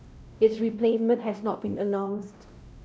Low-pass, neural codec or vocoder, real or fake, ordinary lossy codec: none; codec, 16 kHz, 0.5 kbps, X-Codec, WavLM features, trained on Multilingual LibriSpeech; fake; none